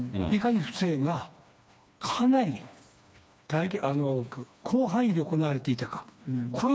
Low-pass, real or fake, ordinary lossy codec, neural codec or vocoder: none; fake; none; codec, 16 kHz, 2 kbps, FreqCodec, smaller model